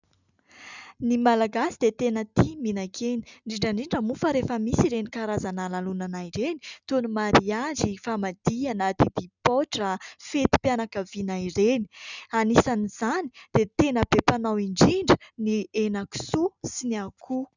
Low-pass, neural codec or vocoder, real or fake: 7.2 kHz; none; real